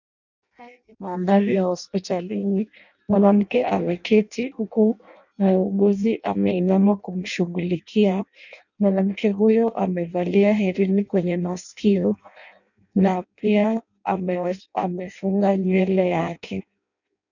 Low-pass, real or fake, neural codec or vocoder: 7.2 kHz; fake; codec, 16 kHz in and 24 kHz out, 0.6 kbps, FireRedTTS-2 codec